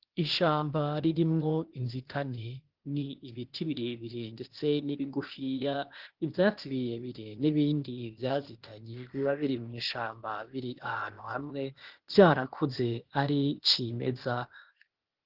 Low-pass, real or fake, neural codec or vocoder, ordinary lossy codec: 5.4 kHz; fake; codec, 16 kHz, 0.8 kbps, ZipCodec; Opus, 16 kbps